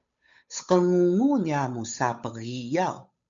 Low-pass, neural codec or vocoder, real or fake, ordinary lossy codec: 7.2 kHz; codec, 16 kHz, 8 kbps, FunCodec, trained on Chinese and English, 25 frames a second; fake; MP3, 64 kbps